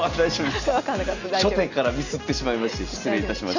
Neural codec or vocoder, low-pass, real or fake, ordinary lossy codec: none; 7.2 kHz; real; none